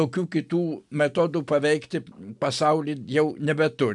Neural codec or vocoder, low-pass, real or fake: none; 10.8 kHz; real